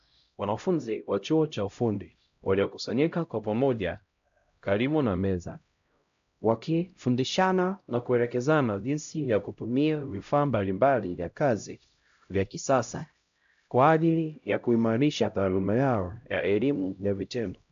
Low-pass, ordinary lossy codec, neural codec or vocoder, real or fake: 7.2 kHz; AAC, 64 kbps; codec, 16 kHz, 0.5 kbps, X-Codec, HuBERT features, trained on LibriSpeech; fake